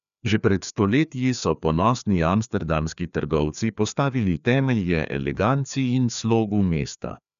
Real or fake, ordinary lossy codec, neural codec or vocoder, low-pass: fake; none; codec, 16 kHz, 2 kbps, FreqCodec, larger model; 7.2 kHz